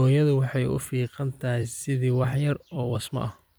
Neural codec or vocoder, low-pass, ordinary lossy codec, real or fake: vocoder, 44.1 kHz, 128 mel bands every 256 samples, BigVGAN v2; none; none; fake